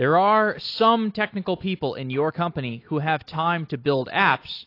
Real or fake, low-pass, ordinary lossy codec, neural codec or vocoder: real; 5.4 kHz; AAC, 32 kbps; none